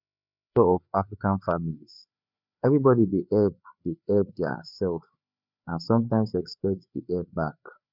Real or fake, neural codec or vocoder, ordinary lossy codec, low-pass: fake; codec, 16 kHz, 4 kbps, FreqCodec, larger model; none; 5.4 kHz